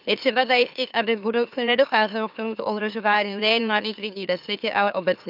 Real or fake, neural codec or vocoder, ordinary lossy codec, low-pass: fake; autoencoder, 44.1 kHz, a latent of 192 numbers a frame, MeloTTS; none; 5.4 kHz